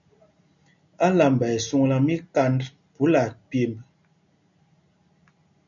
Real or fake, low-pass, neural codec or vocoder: real; 7.2 kHz; none